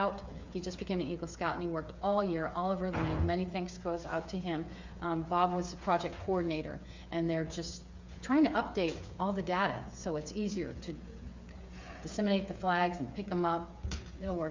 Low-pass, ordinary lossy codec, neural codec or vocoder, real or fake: 7.2 kHz; MP3, 64 kbps; codec, 16 kHz, 8 kbps, FreqCodec, smaller model; fake